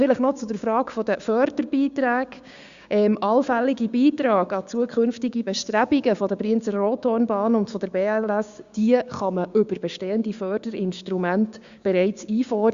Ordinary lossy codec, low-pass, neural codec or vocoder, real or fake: Opus, 64 kbps; 7.2 kHz; codec, 16 kHz, 6 kbps, DAC; fake